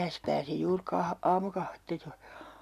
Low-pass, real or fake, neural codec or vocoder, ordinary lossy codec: 14.4 kHz; real; none; none